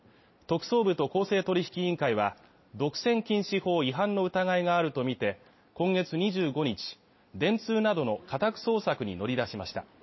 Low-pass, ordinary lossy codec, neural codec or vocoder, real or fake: 7.2 kHz; MP3, 24 kbps; none; real